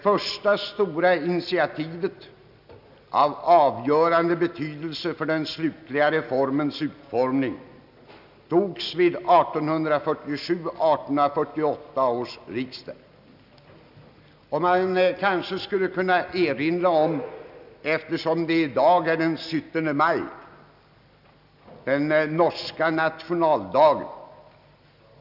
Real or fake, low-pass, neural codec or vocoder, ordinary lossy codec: real; 5.4 kHz; none; none